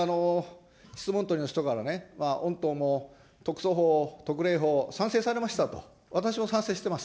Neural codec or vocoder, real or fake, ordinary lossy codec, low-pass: none; real; none; none